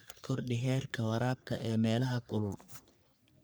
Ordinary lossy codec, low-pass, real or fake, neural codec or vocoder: none; none; fake; codec, 44.1 kHz, 3.4 kbps, Pupu-Codec